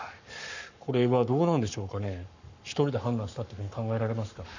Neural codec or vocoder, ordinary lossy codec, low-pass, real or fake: codec, 44.1 kHz, 7.8 kbps, Pupu-Codec; none; 7.2 kHz; fake